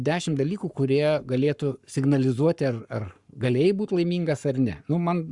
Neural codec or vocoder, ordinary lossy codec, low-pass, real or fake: codec, 44.1 kHz, 7.8 kbps, Pupu-Codec; Opus, 64 kbps; 10.8 kHz; fake